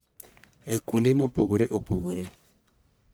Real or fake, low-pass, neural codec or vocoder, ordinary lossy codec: fake; none; codec, 44.1 kHz, 1.7 kbps, Pupu-Codec; none